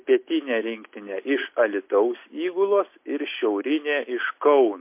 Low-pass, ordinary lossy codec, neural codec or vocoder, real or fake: 3.6 kHz; MP3, 24 kbps; none; real